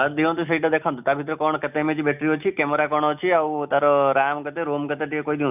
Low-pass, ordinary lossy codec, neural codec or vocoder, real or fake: 3.6 kHz; none; none; real